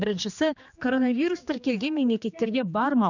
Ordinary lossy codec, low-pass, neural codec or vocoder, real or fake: none; 7.2 kHz; codec, 16 kHz, 2 kbps, X-Codec, HuBERT features, trained on general audio; fake